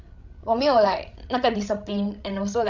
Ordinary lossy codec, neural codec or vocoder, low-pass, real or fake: none; codec, 16 kHz, 16 kbps, FreqCodec, larger model; 7.2 kHz; fake